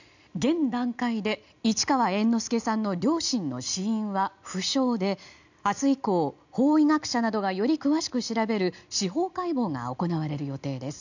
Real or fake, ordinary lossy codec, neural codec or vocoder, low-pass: real; none; none; 7.2 kHz